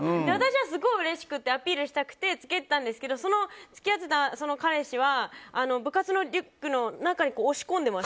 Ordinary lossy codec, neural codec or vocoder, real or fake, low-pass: none; none; real; none